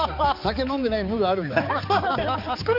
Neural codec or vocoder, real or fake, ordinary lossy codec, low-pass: codec, 16 kHz, 4 kbps, X-Codec, HuBERT features, trained on balanced general audio; fake; none; 5.4 kHz